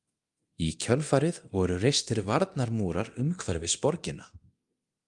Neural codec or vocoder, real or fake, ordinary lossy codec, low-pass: codec, 24 kHz, 0.9 kbps, DualCodec; fake; Opus, 32 kbps; 10.8 kHz